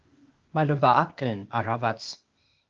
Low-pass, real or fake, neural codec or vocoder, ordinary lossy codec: 7.2 kHz; fake; codec, 16 kHz, 0.8 kbps, ZipCodec; Opus, 24 kbps